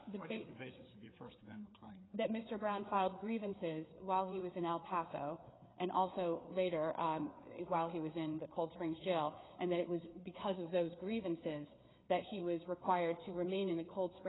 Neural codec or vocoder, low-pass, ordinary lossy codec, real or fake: codec, 16 kHz, 4 kbps, FreqCodec, larger model; 7.2 kHz; AAC, 16 kbps; fake